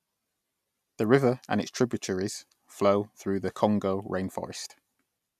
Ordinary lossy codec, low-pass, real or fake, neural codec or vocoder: none; 14.4 kHz; real; none